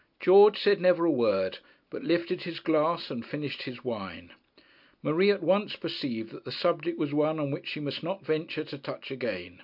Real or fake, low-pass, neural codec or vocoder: real; 5.4 kHz; none